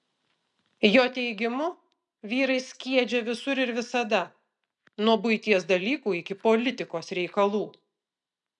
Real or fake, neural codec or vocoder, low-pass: real; none; 10.8 kHz